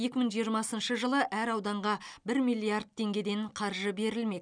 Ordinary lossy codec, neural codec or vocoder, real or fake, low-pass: none; none; real; 9.9 kHz